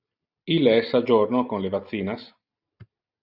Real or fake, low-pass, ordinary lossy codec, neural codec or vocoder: real; 5.4 kHz; Opus, 64 kbps; none